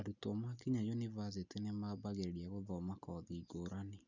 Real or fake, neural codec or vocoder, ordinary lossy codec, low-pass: real; none; none; 7.2 kHz